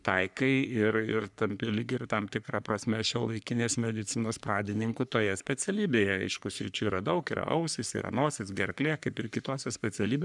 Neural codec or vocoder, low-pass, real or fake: codec, 44.1 kHz, 3.4 kbps, Pupu-Codec; 10.8 kHz; fake